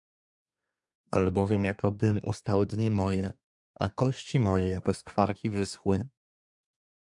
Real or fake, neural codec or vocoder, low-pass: fake; codec, 24 kHz, 1 kbps, SNAC; 10.8 kHz